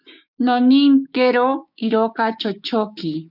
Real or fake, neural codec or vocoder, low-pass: fake; codec, 16 kHz, 6 kbps, DAC; 5.4 kHz